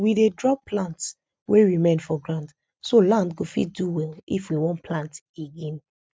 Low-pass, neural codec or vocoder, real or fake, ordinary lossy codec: none; none; real; none